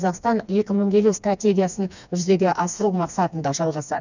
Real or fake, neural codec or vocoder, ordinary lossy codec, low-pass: fake; codec, 16 kHz, 2 kbps, FreqCodec, smaller model; none; 7.2 kHz